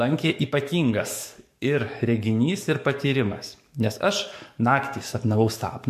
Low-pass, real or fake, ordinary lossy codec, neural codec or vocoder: 14.4 kHz; fake; MP3, 64 kbps; codec, 44.1 kHz, 7.8 kbps, DAC